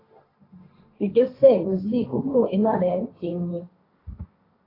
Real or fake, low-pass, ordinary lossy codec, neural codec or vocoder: fake; 5.4 kHz; AAC, 24 kbps; codec, 16 kHz, 1.1 kbps, Voila-Tokenizer